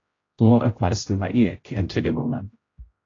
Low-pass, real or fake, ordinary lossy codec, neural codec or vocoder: 7.2 kHz; fake; AAC, 32 kbps; codec, 16 kHz, 0.5 kbps, X-Codec, HuBERT features, trained on general audio